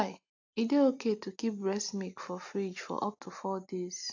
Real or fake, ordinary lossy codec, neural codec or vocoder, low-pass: real; AAC, 48 kbps; none; 7.2 kHz